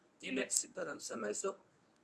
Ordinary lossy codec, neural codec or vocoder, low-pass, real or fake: none; codec, 24 kHz, 0.9 kbps, WavTokenizer, medium speech release version 1; none; fake